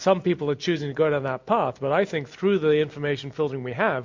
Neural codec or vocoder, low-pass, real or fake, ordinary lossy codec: none; 7.2 kHz; real; MP3, 48 kbps